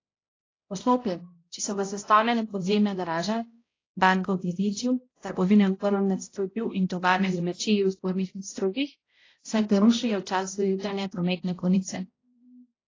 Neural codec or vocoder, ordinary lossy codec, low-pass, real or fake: codec, 16 kHz, 0.5 kbps, X-Codec, HuBERT features, trained on balanced general audio; AAC, 32 kbps; 7.2 kHz; fake